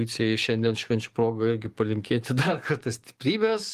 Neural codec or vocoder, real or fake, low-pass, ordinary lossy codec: codec, 44.1 kHz, 7.8 kbps, DAC; fake; 14.4 kHz; Opus, 24 kbps